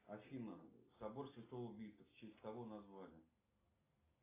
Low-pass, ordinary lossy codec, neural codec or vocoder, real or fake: 3.6 kHz; AAC, 16 kbps; none; real